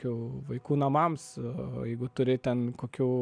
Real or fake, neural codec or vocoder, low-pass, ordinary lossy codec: real; none; 9.9 kHz; MP3, 96 kbps